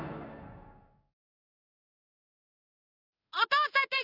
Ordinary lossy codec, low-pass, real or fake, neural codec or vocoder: AAC, 32 kbps; 5.4 kHz; fake; codec, 16 kHz in and 24 kHz out, 1 kbps, XY-Tokenizer